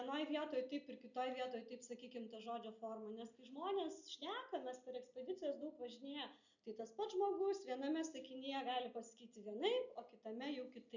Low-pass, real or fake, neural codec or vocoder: 7.2 kHz; real; none